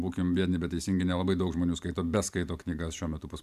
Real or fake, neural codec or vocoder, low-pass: fake; vocoder, 44.1 kHz, 128 mel bands every 512 samples, BigVGAN v2; 14.4 kHz